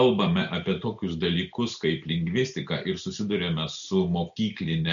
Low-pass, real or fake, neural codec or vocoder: 7.2 kHz; real; none